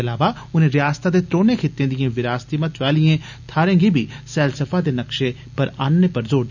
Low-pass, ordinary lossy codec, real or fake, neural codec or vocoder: 7.2 kHz; none; real; none